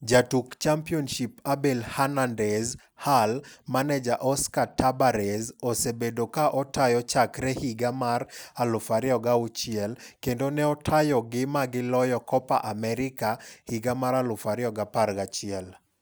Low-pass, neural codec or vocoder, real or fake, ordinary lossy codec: none; none; real; none